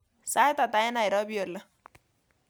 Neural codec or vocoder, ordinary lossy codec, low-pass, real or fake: none; none; none; real